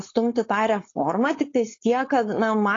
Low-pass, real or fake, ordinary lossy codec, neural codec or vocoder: 7.2 kHz; fake; AAC, 32 kbps; codec, 16 kHz, 4.8 kbps, FACodec